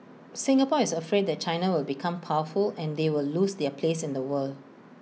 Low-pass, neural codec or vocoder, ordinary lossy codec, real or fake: none; none; none; real